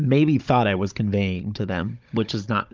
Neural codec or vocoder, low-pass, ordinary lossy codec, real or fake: codec, 16 kHz, 16 kbps, FunCodec, trained on Chinese and English, 50 frames a second; 7.2 kHz; Opus, 32 kbps; fake